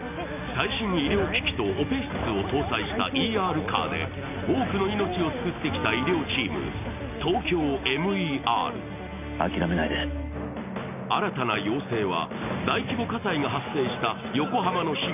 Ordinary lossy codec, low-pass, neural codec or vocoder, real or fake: none; 3.6 kHz; none; real